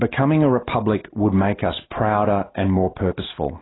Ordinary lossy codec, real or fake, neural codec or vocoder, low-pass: AAC, 16 kbps; real; none; 7.2 kHz